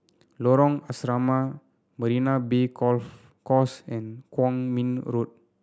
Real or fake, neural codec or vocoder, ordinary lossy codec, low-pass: real; none; none; none